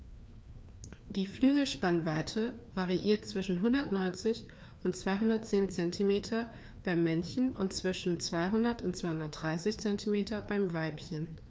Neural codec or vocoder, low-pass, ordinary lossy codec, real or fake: codec, 16 kHz, 2 kbps, FreqCodec, larger model; none; none; fake